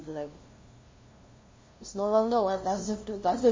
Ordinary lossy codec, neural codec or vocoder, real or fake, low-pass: MP3, 32 kbps; codec, 16 kHz, 0.5 kbps, FunCodec, trained on LibriTTS, 25 frames a second; fake; 7.2 kHz